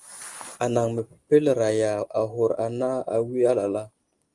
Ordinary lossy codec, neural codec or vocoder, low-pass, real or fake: Opus, 24 kbps; none; 10.8 kHz; real